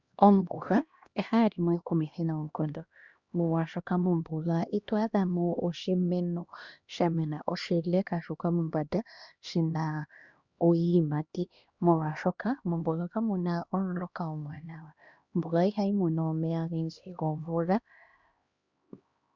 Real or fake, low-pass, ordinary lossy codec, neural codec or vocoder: fake; 7.2 kHz; Opus, 64 kbps; codec, 16 kHz, 1 kbps, X-Codec, HuBERT features, trained on LibriSpeech